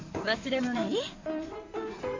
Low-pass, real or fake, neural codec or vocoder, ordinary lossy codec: 7.2 kHz; fake; codec, 16 kHz in and 24 kHz out, 2.2 kbps, FireRedTTS-2 codec; MP3, 48 kbps